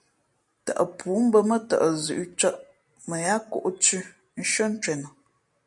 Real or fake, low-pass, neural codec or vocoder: real; 10.8 kHz; none